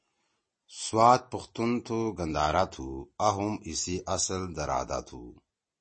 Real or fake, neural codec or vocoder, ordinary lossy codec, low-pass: real; none; MP3, 32 kbps; 9.9 kHz